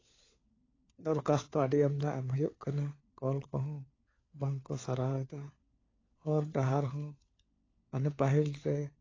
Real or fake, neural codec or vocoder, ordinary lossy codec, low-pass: fake; codec, 16 kHz, 4 kbps, FunCodec, trained on LibriTTS, 50 frames a second; AAC, 32 kbps; 7.2 kHz